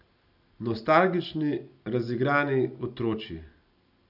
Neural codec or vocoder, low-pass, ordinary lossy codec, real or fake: none; 5.4 kHz; none; real